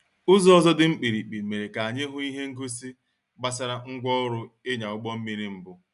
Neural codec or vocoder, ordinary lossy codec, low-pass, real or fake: none; none; 10.8 kHz; real